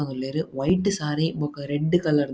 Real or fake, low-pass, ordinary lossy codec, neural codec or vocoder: real; none; none; none